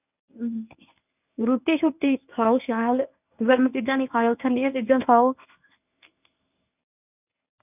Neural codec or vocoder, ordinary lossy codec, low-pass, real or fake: codec, 24 kHz, 0.9 kbps, WavTokenizer, medium speech release version 1; none; 3.6 kHz; fake